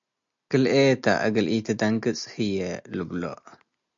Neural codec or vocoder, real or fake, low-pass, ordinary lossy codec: none; real; 7.2 kHz; MP3, 64 kbps